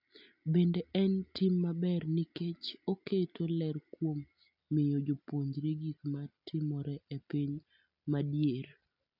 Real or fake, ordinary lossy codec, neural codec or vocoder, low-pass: real; none; none; 5.4 kHz